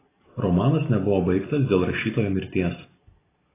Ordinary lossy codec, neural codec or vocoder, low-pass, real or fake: AAC, 16 kbps; none; 3.6 kHz; real